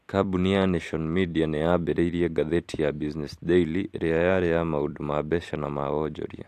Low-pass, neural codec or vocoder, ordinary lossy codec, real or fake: 14.4 kHz; vocoder, 44.1 kHz, 128 mel bands every 256 samples, BigVGAN v2; AAC, 64 kbps; fake